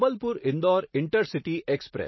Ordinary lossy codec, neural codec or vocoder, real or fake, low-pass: MP3, 24 kbps; none; real; 7.2 kHz